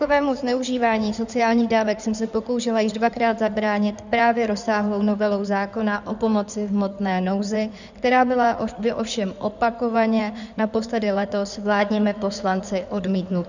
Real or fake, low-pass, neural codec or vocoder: fake; 7.2 kHz; codec, 16 kHz in and 24 kHz out, 2.2 kbps, FireRedTTS-2 codec